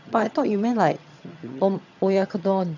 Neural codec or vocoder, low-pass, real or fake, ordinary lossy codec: vocoder, 22.05 kHz, 80 mel bands, HiFi-GAN; 7.2 kHz; fake; none